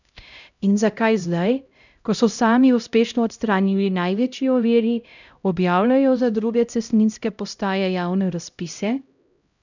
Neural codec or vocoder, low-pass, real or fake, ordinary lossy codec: codec, 16 kHz, 0.5 kbps, X-Codec, HuBERT features, trained on LibriSpeech; 7.2 kHz; fake; none